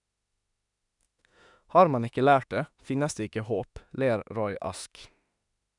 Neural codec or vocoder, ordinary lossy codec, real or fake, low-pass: autoencoder, 48 kHz, 32 numbers a frame, DAC-VAE, trained on Japanese speech; none; fake; 10.8 kHz